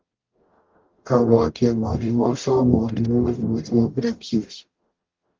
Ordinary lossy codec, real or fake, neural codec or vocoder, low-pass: Opus, 32 kbps; fake; codec, 44.1 kHz, 0.9 kbps, DAC; 7.2 kHz